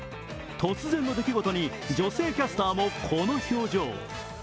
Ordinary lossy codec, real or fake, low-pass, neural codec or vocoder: none; real; none; none